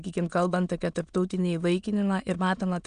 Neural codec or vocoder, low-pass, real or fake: autoencoder, 22.05 kHz, a latent of 192 numbers a frame, VITS, trained on many speakers; 9.9 kHz; fake